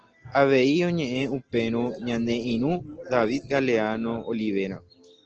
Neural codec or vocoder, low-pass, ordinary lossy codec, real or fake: none; 7.2 kHz; Opus, 16 kbps; real